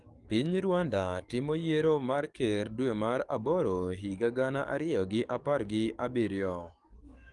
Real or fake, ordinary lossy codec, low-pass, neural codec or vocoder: fake; Opus, 32 kbps; 10.8 kHz; codec, 44.1 kHz, 7.8 kbps, DAC